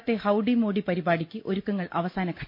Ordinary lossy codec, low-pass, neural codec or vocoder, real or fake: MP3, 48 kbps; 5.4 kHz; none; real